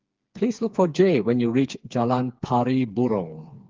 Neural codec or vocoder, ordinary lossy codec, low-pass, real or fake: codec, 16 kHz, 4 kbps, FreqCodec, smaller model; Opus, 32 kbps; 7.2 kHz; fake